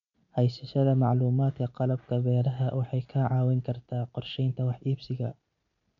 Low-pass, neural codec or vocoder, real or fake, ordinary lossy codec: 7.2 kHz; none; real; none